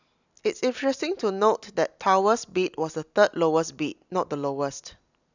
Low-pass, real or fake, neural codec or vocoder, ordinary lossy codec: 7.2 kHz; real; none; none